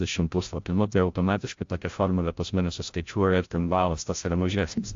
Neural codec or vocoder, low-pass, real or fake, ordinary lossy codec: codec, 16 kHz, 0.5 kbps, FreqCodec, larger model; 7.2 kHz; fake; AAC, 48 kbps